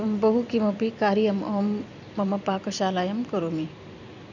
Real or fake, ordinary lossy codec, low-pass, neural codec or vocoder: fake; none; 7.2 kHz; vocoder, 44.1 kHz, 128 mel bands every 256 samples, BigVGAN v2